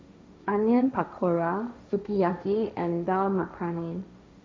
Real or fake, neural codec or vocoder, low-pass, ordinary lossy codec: fake; codec, 16 kHz, 1.1 kbps, Voila-Tokenizer; none; none